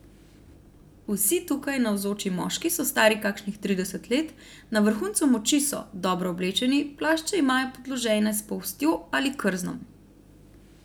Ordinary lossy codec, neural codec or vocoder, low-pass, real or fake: none; none; none; real